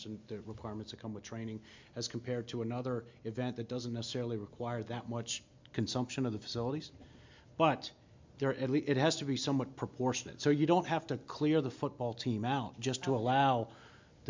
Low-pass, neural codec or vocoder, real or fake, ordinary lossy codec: 7.2 kHz; none; real; MP3, 64 kbps